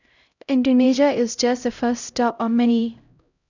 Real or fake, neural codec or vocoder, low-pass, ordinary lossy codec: fake; codec, 16 kHz, 0.5 kbps, X-Codec, HuBERT features, trained on LibriSpeech; 7.2 kHz; none